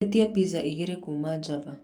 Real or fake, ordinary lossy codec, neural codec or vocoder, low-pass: fake; none; codec, 44.1 kHz, 7.8 kbps, DAC; 19.8 kHz